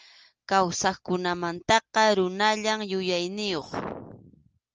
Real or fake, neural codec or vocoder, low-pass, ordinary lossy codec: real; none; 7.2 kHz; Opus, 24 kbps